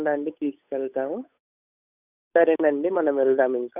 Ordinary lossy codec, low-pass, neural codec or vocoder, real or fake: none; 3.6 kHz; codec, 16 kHz, 8 kbps, FunCodec, trained on Chinese and English, 25 frames a second; fake